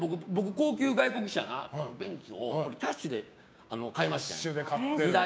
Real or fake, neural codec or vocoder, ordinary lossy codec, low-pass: fake; codec, 16 kHz, 6 kbps, DAC; none; none